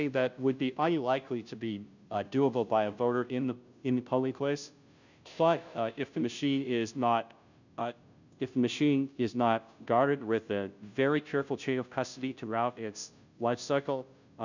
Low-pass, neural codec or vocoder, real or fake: 7.2 kHz; codec, 16 kHz, 0.5 kbps, FunCodec, trained on Chinese and English, 25 frames a second; fake